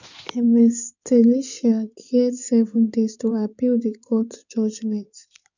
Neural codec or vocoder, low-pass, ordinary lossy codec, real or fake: codec, 16 kHz in and 24 kHz out, 2.2 kbps, FireRedTTS-2 codec; 7.2 kHz; none; fake